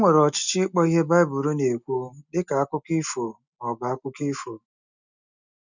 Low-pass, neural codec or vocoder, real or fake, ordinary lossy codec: 7.2 kHz; none; real; none